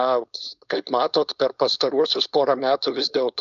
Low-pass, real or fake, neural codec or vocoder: 7.2 kHz; fake; codec, 16 kHz, 4.8 kbps, FACodec